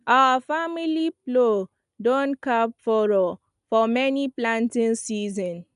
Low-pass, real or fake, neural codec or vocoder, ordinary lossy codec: 10.8 kHz; real; none; none